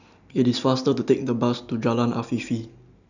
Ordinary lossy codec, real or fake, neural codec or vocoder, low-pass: none; real; none; 7.2 kHz